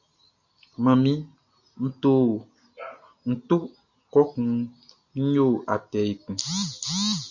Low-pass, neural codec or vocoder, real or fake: 7.2 kHz; none; real